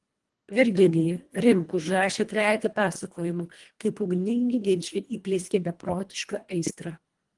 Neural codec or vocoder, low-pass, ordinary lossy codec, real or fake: codec, 24 kHz, 1.5 kbps, HILCodec; 10.8 kHz; Opus, 24 kbps; fake